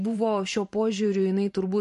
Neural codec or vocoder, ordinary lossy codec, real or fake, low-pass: vocoder, 44.1 kHz, 128 mel bands every 512 samples, BigVGAN v2; MP3, 48 kbps; fake; 14.4 kHz